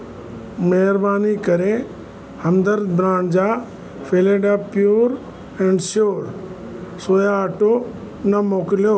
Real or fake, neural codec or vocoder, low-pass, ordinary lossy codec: real; none; none; none